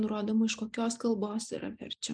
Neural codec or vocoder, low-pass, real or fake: none; 9.9 kHz; real